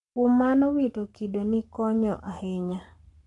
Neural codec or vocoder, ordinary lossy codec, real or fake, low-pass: codec, 44.1 kHz, 7.8 kbps, Pupu-Codec; none; fake; 10.8 kHz